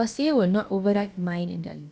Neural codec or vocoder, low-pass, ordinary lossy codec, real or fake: codec, 16 kHz, about 1 kbps, DyCAST, with the encoder's durations; none; none; fake